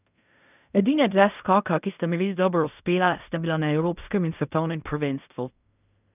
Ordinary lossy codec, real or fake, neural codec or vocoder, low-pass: none; fake; codec, 16 kHz in and 24 kHz out, 0.4 kbps, LongCat-Audio-Codec, fine tuned four codebook decoder; 3.6 kHz